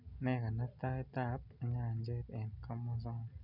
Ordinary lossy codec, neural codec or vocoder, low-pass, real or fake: none; none; 5.4 kHz; real